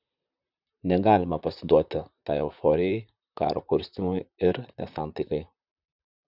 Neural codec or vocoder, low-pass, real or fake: vocoder, 44.1 kHz, 128 mel bands, Pupu-Vocoder; 5.4 kHz; fake